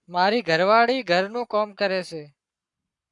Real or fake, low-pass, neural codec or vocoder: fake; 10.8 kHz; codec, 44.1 kHz, 7.8 kbps, Pupu-Codec